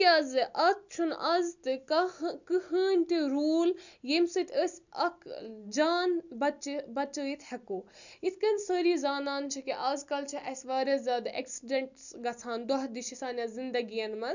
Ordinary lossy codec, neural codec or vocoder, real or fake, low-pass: none; none; real; 7.2 kHz